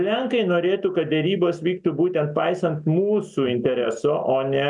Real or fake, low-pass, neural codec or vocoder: real; 10.8 kHz; none